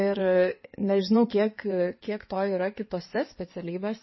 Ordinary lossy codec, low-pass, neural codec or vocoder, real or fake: MP3, 24 kbps; 7.2 kHz; codec, 16 kHz in and 24 kHz out, 2.2 kbps, FireRedTTS-2 codec; fake